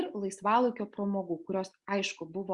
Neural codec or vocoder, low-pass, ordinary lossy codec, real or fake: none; 10.8 kHz; Opus, 64 kbps; real